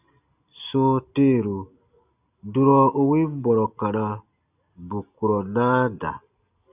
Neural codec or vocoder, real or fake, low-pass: none; real; 3.6 kHz